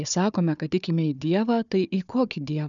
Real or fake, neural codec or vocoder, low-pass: fake; codec, 16 kHz, 4 kbps, FunCodec, trained on Chinese and English, 50 frames a second; 7.2 kHz